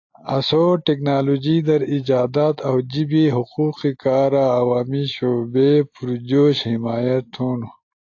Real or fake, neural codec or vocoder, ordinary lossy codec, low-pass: real; none; AAC, 48 kbps; 7.2 kHz